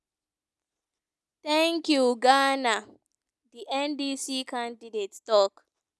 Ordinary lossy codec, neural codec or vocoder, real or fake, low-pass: none; none; real; none